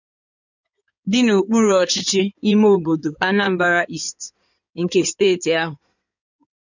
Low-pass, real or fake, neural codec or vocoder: 7.2 kHz; fake; codec, 16 kHz in and 24 kHz out, 2.2 kbps, FireRedTTS-2 codec